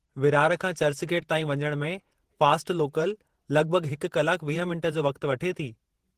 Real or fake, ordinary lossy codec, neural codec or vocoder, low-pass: fake; Opus, 16 kbps; vocoder, 48 kHz, 128 mel bands, Vocos; 14.4 kHz